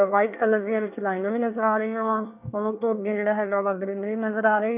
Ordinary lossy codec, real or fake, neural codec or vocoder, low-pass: none; fake; codec, 24 kHz, 1 kbps, SNAC; 3.6 kHz